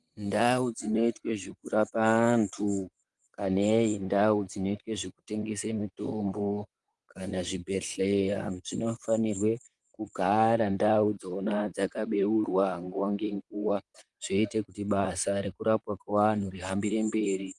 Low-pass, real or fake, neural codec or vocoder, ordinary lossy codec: 10.8 kHz; fake; vocoder, 44.1 kHz, 128 mel bands, Pupu-Vocoder; Opus, 32 kbps